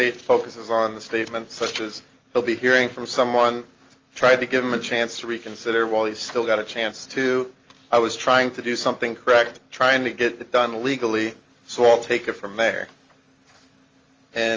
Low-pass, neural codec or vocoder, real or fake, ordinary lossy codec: 7.2 kHz; none; real; Opus, 32 kbps